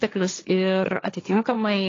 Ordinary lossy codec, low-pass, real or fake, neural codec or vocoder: AAC, 32 kbps; 7.2 kHz; fake; codec, 16 kHz, 1.1 kbps, Voila-Tokenizer